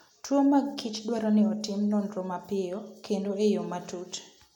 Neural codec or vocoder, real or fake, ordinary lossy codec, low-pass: none; real; MP3, 96 kbps; 19.8 kHz